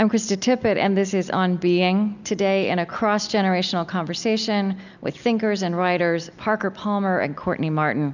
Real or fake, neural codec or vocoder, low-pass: real; none; 7.2 kHz